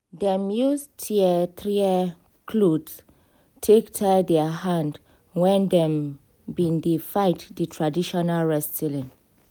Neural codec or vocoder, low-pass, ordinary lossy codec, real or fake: none; none; none; real